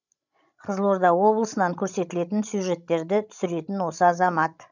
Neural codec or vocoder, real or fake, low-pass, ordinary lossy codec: codec, 16 kHz, 16 kbps, FreqCodec, larger model; fake; 7.2 kHz; none